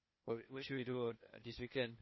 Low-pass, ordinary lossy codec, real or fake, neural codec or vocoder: 7.2 kHz; MP3, 24 kbps; fake; codec, 16 kHz, 0.8 kbps, ZipCodec